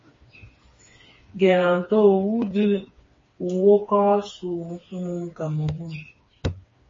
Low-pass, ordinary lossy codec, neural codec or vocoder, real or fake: 7.2 kHz; MP3, 32 kbps; codec, 16 kHz, 4 kbps, FreqCodec, smaller model; fake